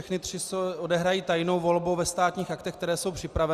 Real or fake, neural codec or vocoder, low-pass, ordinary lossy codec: real; none; 14.4 kHz; Opus, 64 kbps